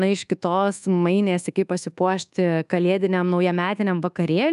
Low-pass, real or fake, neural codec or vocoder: 10.8 kHz; fake; codec, 24 kHz, 1.2 kbps, DualCodec